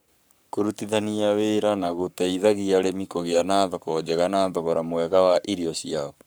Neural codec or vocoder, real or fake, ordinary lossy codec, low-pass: codec, 44.1 kHz, 7.8 kbps, Pupu-Codec; fake; none; none